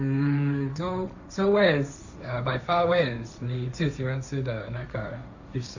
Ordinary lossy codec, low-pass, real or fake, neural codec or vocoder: none; 7.2 kHz; fake; codec, 16 kHz, 1.1 kbps, Voila-Tokenizer